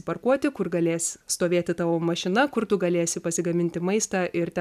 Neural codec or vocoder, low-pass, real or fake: none; 14.4 kHz; real